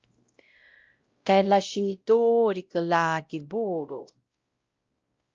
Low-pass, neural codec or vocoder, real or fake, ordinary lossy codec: 7.2 kHz; codec, 16 kHz, 0.5 kbps, X-Codec, WavLM features, trained on Multilingual LibriSpeech; fake; Opus, 24 kbps